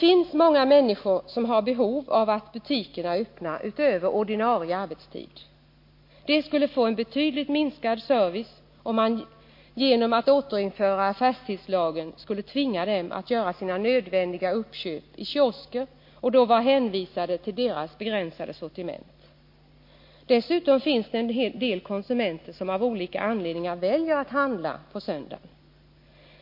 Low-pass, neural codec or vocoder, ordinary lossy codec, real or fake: 5.4 kHz; none; MP3, 32 kbps; real